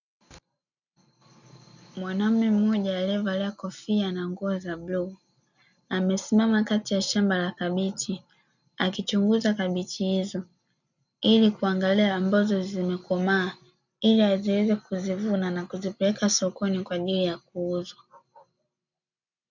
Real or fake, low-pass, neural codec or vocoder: real; 7.2 kHz; none